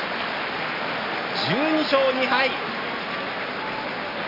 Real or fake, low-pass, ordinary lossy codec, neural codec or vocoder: real; 5.4 kHz; none; none